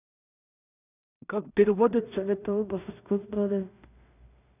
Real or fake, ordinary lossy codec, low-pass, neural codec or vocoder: fake; none; 3.6 kHz; codec, 16 kHz in and 24 kHz out, 0.4 kbps, LongCat-Audio-Codec, two codebook decoder